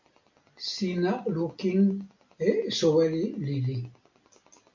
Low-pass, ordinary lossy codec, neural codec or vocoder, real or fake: 7.2 kHz; MP3, 48 kbps; none; real